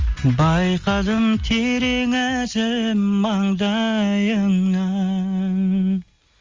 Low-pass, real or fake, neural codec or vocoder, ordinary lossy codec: 7.2 kHz; real; none; Opus, 32 kbps